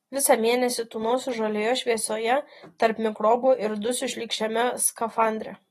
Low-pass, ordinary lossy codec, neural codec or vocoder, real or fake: 19.8 kHz; AAC, 32 kbps; none; real